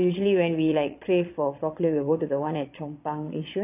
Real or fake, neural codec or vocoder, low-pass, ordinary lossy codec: fake; vocoder, 22.05 kHz, 80 mel bands, Vocos; 3.6 kHz; none